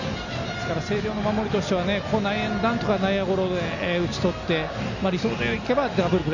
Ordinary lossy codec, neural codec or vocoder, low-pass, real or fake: none; none; 7.2 kHz; real